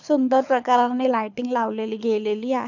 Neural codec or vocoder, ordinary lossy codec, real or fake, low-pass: codec, 24 kHz, 6 kbps, HILCodec; none; fake; 7.2 kHz